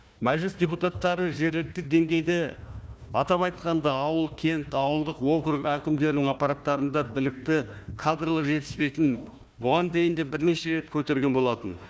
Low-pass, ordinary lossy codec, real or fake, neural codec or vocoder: none; none; fake; codec, 16 kHz, 1 kbps, FunCodec, trained on Chinese and English, 50 frames a second